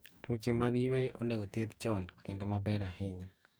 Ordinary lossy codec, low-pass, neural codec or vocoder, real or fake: none; none; codec, 44.1 kHz, 2.6 kbps, DAC; fake